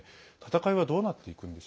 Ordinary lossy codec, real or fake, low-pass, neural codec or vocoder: none; real; none; none